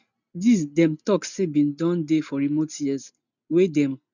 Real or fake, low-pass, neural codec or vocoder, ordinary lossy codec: real; 7.2 kHz; none; none